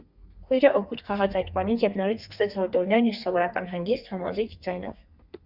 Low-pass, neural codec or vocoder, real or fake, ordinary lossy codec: 5.4 kHz; codec, 32 kHz, 1.9 kbps, SNAC; fake; Opus, 64 kbps